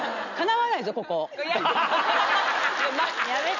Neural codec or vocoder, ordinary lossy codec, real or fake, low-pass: none; none; real; 7.2 kHz